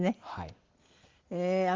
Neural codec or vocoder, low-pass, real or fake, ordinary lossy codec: none; 7.2 kHz; real; Opus, 16 kbps